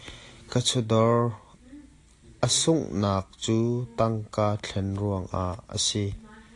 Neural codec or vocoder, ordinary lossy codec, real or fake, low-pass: none; AAC, 48 kbps; real; 10.8 kHz